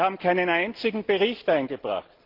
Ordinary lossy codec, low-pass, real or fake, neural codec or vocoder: Opus, 16 kbps; 5.4 kHz; real; none